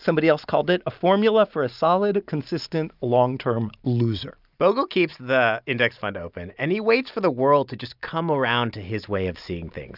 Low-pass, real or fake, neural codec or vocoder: 5.4 kHz; real; none